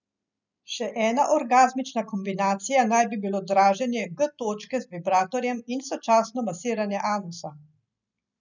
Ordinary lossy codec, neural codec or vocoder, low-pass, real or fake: none; none; 7.2 kHz; real